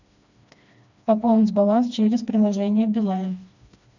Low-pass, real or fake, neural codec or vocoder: 7.2 kHz; fake; codec, 16 kHz, 2 kbps, FreqCodec, smaller model